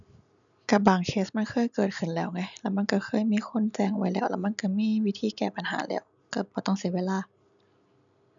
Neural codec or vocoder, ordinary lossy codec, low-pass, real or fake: none; none; 7.2 kHz; real